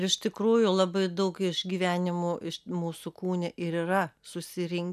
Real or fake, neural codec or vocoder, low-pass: real; none; 14.4 kHz